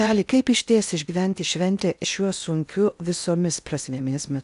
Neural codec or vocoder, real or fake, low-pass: codec, 16 kHz in and 24 kHz out, 0.6 kbps, FocalCodec, streaming, 4096 codes; fake; 10.8 kHz